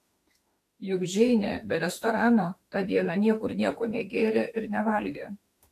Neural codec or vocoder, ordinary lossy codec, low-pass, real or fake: autoencoder, 48 kHz, 32 numbers a frame, DAC-VAE, trained on Japanese speech; AAC, 64 kbps; 14.4 kHz; fake